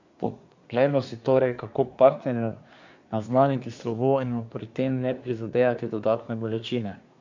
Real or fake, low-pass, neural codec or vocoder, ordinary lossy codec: fake; 7.2 kHz; codec, 24 kHz, 1 kbps, SNAC; MP3, 64 kbps